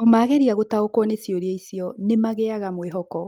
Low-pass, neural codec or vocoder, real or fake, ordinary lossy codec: 19.8 kHz; none; real; Opus, 32 kbps